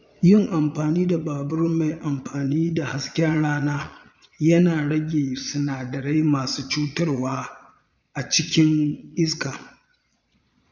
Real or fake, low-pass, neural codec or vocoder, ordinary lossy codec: fake; 7.2 kHz; vocoder, 22.05 kHz, 80 mel bands, Vocos; none